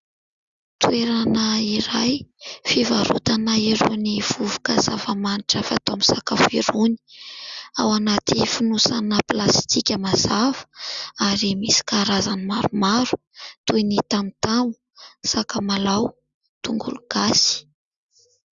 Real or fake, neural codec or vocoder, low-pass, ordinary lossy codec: real; none; 7.2 kHz; Opus, 64 kbps